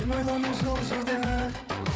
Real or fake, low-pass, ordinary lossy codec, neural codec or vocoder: fake; none; none; codec, 16 kHz, 8 kbps, FreqCodec, larger model